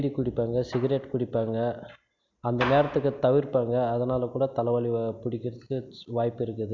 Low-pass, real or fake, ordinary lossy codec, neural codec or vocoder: 7.2 kHz; real; MP3, 64 kbps; none